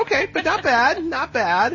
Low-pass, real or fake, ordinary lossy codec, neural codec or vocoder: 7.2 kHz; real; MP3, 32 kbps; none